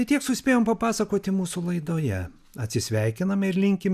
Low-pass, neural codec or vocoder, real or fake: 14.4 kHz; none; real